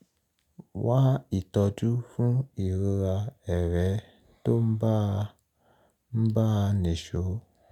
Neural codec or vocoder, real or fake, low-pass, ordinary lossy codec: none; real; 19.8 kHz; none